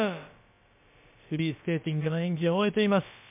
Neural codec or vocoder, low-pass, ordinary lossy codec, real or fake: codec, 16 kHz, about 1 kbps, DyCAST, with the encoder's durations; 3.6 kHz; MP3, 32 kbps; fake